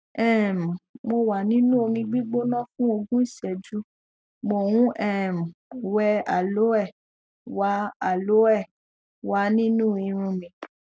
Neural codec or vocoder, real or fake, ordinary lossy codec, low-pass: none; real; none; none